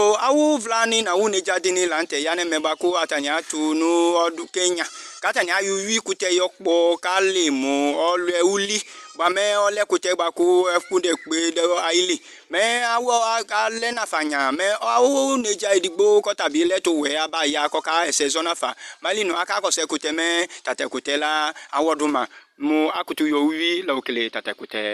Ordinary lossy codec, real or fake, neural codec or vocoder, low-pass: Opus, 64 kbps; real; none; 14.4 kHz